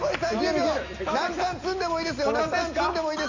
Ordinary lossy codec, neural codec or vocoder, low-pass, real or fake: AAC, 48 kbps; none; 7.2 kHz; real